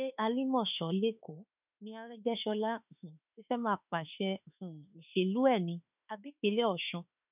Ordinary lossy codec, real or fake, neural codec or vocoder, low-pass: none; fake; autoencoder, 48 kHz, 32 numbers a frame, DAC-VAE, trained on Japanese speech; 3.6 kHz